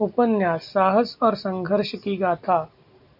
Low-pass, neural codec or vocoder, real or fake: 5.4 kHz; none; real